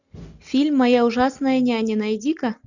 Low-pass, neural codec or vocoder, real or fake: 7.2 kHz; none; real